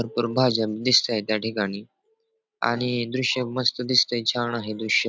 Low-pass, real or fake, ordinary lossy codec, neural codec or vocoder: none; real; none; none